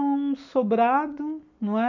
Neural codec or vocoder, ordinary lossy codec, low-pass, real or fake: none; none; 7.2 kHz; real